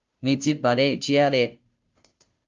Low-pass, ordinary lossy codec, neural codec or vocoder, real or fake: 7.2 kHz; Opus, 32 kbps; codec, 16 kHz, 0.5 kbps, FunCodec, trained on Chinese and English, 25 frames a second; fake